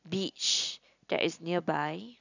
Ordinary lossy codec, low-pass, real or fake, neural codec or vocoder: none; 7.2 kHz; real; none